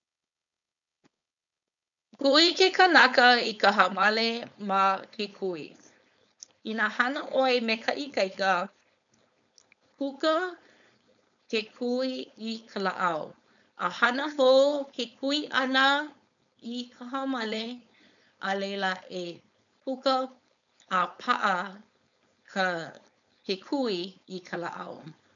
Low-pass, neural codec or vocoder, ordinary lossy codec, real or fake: 7.2 kHz; codec, 16 kHz, 4.8 kbps, FACodec; none; fake